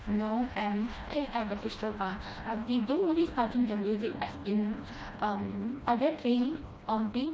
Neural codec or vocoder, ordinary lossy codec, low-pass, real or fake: codec, 16 kHz, 1 kbps, FreqCodec, smaller model; none; none; fake